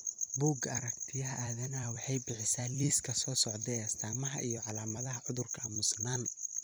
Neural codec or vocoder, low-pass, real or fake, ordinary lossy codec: vocoder, 44.1 kHz, 128 mel bands every 256 samples, BigVGAN v2; none; fake; none